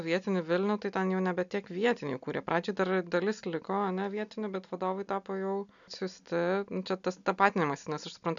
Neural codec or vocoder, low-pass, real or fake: none; 7.2 kHz; real